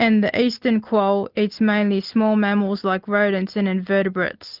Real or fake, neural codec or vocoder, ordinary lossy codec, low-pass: real; none; Opus, 32 kbps; 5.4 kHz